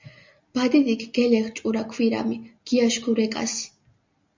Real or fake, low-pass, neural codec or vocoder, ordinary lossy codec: real; 7.2 kHz; none; MP3, 64 kbps